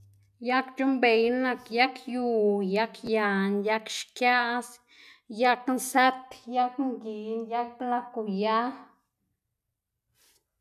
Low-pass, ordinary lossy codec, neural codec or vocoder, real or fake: 14.4 kHz; none; none; real